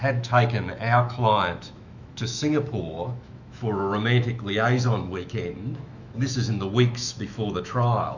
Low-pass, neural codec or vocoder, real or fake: 7.2 kHz; autoencoder, 48 kHz, 128 numbers a frame, DAC-VAE, trained on Japanese speech; fake